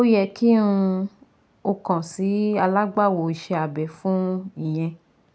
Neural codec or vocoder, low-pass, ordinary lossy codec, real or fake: none; none; none; real